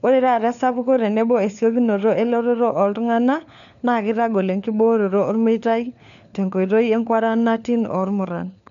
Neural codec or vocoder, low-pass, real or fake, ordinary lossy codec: codec, 16 kHz, 4 kbps, FunCodec, trained on LibriTTS, 50 frames a second; 7.2 kHz; fake; none